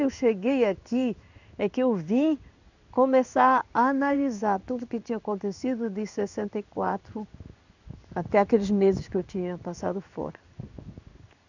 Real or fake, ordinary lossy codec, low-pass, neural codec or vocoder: fake; none; 7.2 kHz; codec, 16 kHz in and 24 kHz out, 1 kbps, XY-Tokenizer